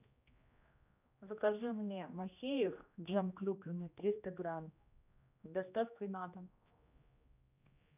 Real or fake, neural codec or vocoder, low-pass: fake; codec, 16 kHz, 1 kbps, X-Codec, HuBERT features, trained on general audio; 3.6 kHz